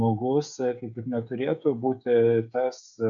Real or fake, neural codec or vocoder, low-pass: fake; codec, 16 kHz, 8 kbps, FreqCodec, smaller model; 7.2 kHz